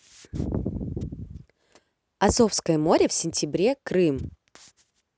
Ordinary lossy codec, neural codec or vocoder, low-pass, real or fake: none; none; none; real